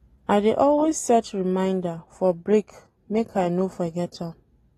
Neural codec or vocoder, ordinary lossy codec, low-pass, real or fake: none; AAC, 32 kbps; 19.8 kHz; real